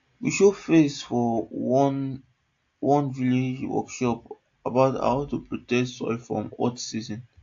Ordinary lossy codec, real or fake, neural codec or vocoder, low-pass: AAC, 48 kbps; real; none; 7.2 kHz